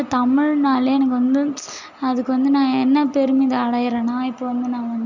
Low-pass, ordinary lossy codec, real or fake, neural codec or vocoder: 7.2 kHz; none; real; none